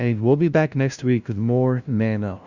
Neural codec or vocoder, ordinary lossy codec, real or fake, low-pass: codec, 16 kHz, 0.5 kbps, FunCodec, trained on LibriTTS, 25 frames a second; Opus, 64 kbps; fake; 7.2 kHz